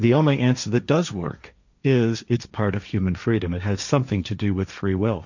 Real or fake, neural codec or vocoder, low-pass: fake; codec, 16 kHz, 1.1 kbps, Voila-Tokenizer; 7.2 kHz